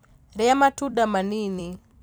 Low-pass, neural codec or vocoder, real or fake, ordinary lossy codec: none; vocoder, 44.1 kHz, 128 mel bands every 256 samples, BigVGAN v2; fake; none